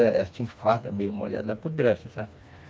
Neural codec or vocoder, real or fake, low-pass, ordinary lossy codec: codec, 16 kHz, 2 kbps, FreqCodec, smaller model; fake; none; none